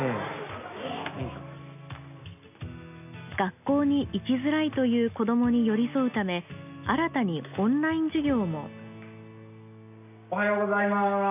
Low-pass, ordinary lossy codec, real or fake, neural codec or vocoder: 3.6 kHz; none; real; none